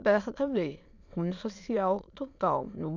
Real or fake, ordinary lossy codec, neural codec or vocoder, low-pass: fake; none; autoencoder, 22.05 kHz, a latent of 192 numbers a frame, VITS, trained on many speakers; 7.2 kHz